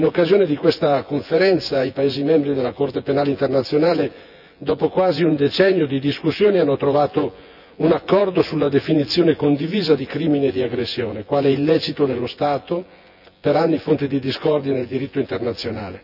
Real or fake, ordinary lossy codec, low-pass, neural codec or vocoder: fake; none; 5.4 kHz; vocoder, 24 kHz, 100 mel bands, Vocos